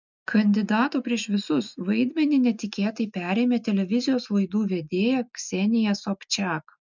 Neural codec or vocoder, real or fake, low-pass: none; real; 7.2 kHz